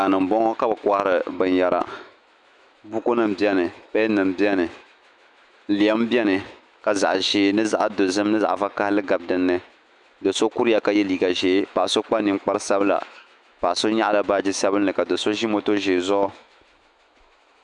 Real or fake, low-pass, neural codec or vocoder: fake; 10.8 kHz; autoencoder, 48 kHz, 128 numbers a frame, DAC-VAE, trained on Japanese speech